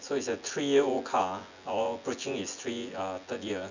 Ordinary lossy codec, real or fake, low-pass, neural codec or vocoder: none; fake; 7.2 kHz; vocoder, 24 kHz, 100 mel bands, Vocos